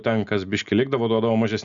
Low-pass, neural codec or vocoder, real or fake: 7.2 kHz; none; real